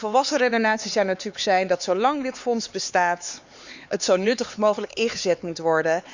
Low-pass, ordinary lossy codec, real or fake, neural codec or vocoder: 7.2 kHz; Opus, 64 kbps; fake; codec, 16 kHz, 4 kbps, X-Codec, HuBERT features, trained on LibriSpeech